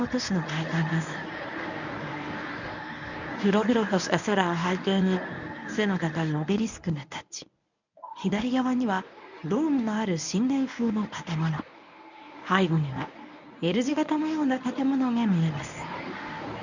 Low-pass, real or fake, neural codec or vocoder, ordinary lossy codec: 7.2 kHz; fake; codec, 24 kHz, 0.9 kbps, WavTokenizer, medium speech release version 1; none